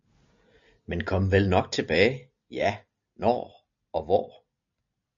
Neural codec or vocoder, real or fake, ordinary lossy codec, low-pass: none; real; MP3, 96 kbps; 7.2 kHz